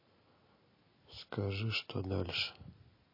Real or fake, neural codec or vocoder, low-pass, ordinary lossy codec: real; none; 5.4 kHz; MP3, 24 kbps